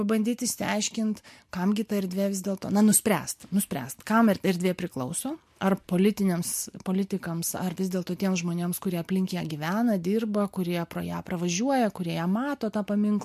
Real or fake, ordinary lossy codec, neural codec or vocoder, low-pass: real; MP3, 64 kbps; none; 14.4 kHz